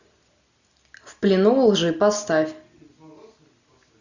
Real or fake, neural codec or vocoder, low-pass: real; none; 7.2 kHz